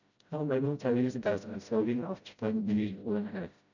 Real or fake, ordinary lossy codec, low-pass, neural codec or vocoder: fake; none; 7.2 kHz; codec, 16 kHz, 0.5 kbps, FreqCodec, smaller model